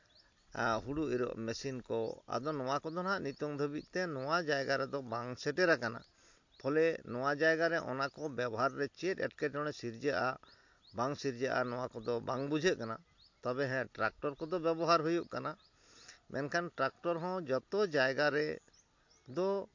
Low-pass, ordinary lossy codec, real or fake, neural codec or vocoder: 7.2 kHz; MP3, 48 kbps; real; none